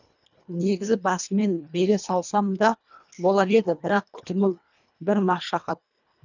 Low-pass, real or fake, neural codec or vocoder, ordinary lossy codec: 7.2 kHz; fake; codec, 24 kHz, 1.5 kbps, HILCodec; none